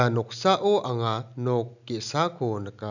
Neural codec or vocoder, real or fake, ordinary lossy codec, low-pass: none; real; none; 7.2 kHz